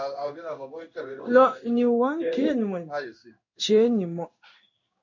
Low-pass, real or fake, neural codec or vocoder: 7.2 kHz; fake; codec, 16 kHz in and 24 kHz out, 1 kbps, XY-Tokenizer